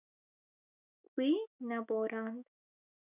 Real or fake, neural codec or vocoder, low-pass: fake; autoencoder, 48 kHz, 128 numbers a frame, DAC-VAE, trained on Japanese speech; 3.6 kHz